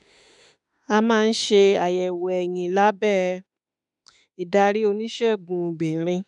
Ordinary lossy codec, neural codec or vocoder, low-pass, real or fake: none; autoencoder, 48 kHz, 32 numbers a frame, DAC-VAE, trained on Japanese speech; 10.8 kHz; fake